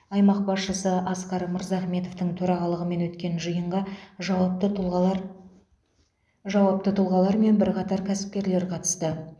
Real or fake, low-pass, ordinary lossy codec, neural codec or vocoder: real; none; none; none